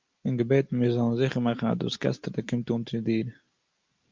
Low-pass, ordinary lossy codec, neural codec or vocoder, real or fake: 7.2 kHz; Opus, 24 kbps; none; real